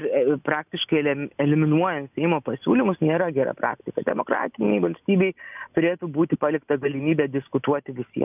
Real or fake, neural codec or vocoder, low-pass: real; none; 3.6 kHz